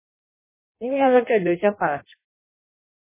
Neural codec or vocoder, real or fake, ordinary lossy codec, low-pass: codec, 16 kHz in and 24 kHz out, 0.6 kbps, FireRedTTS-2 codec; fake; MP3, 16 kbps; 3.6 kHz